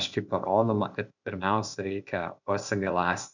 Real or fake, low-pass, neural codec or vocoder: fake; 7.2 kHz; codec, 16 kHz, 0.8 kbps, ZipCodec